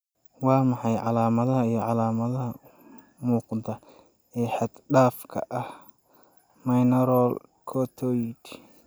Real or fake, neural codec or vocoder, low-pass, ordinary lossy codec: real; none; none; none